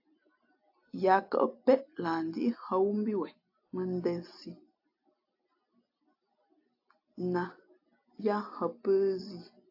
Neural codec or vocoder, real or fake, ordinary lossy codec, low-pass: none; real; AAC, 32 kbps; 5.4 kHz